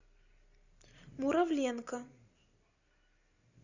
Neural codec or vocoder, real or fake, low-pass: none; real; 7.2 kHz